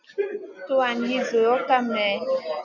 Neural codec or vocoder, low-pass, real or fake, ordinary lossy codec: none; 7.2 kHz; real; MP3, 64 kbps